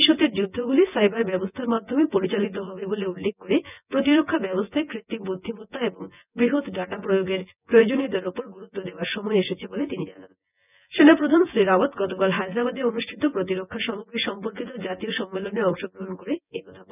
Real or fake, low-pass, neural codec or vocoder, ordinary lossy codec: fake; 3.6 kHz; vocoder, 24 kHz, 100 mel bands, Vocos; none